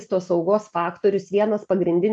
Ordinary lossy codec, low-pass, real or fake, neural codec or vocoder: AAC, 64 kbps; 9.9 kHz; real; none